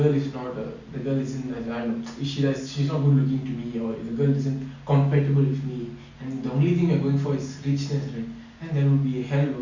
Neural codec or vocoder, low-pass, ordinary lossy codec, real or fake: none; 7.2 kHz; Opus, 64 kbps; real